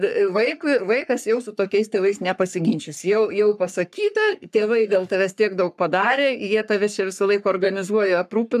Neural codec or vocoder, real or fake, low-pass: codec, 44.1 kHz, 3.4 kbps, Pupu-Codec; fake; 14.4 kHz